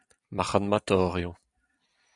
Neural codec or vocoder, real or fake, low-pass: vocoder, 44.1 kHz, 128 mel bands every 256 samples, BigVGAN v2; fake; 10.8 kHz